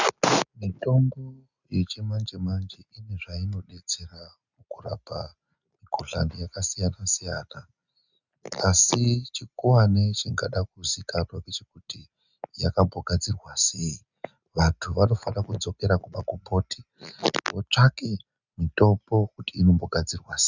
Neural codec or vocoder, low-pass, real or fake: none; 7.2 kHz; real